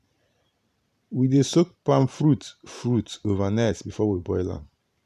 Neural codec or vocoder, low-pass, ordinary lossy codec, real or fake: vocoder, 44.1 kHz, 128 mel bands every 512 samples, BigVGAN v2; 14.4 kHz; none; fake